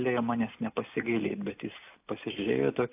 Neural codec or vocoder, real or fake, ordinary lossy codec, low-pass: none; real; AAC, 32 kbps; 3.6 kHz